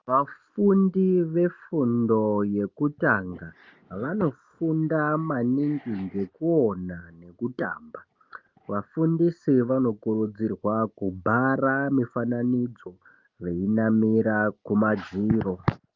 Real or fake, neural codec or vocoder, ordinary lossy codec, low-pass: real; none; Opus, 32 kbps; 7.2 kHz